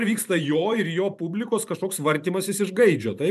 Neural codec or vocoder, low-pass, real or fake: vocoder, 44.1 kHz, 128 mel bands every 512 samples, BigVGAN v2; 14.4 kHz; fake